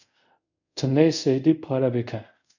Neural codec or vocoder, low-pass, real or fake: codec, 24 kHz, 0.5 kbps, DualCodec; 7.2 kHz; fake